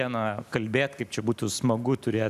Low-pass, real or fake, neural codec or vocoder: 14.4 kHz; real; none